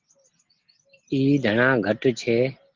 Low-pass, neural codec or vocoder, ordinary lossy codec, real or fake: 7.2 kHz; none; Opus, 16 kbps; real